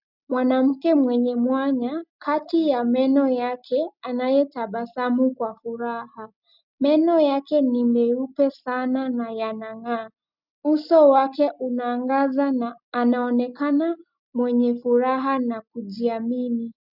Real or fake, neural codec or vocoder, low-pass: real; none; 5.4 kHz